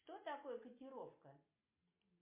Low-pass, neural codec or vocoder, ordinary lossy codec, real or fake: 3.6 kHz; none; MP3, 24 kbps; real